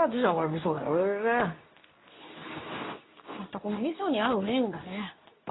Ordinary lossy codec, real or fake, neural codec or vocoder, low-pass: AAC, 16 kbps; fake; codec, 24 kHz, 0.9 kbps, WavTokenizer, medium speech release version 2; 7.2 kHz